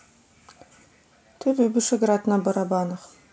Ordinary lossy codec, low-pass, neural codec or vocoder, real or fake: none; none; none; real